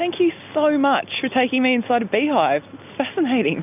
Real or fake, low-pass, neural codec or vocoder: real; 3.6 kHz; none